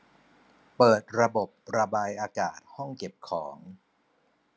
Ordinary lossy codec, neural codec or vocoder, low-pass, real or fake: none; none; none; real